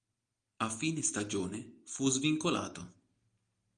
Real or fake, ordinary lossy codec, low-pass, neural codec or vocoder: real; Opus, 32 kbps; 9.9 kHz; none